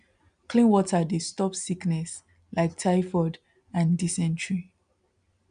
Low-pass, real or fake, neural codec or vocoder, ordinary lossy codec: 9.9 kHz; real; none; none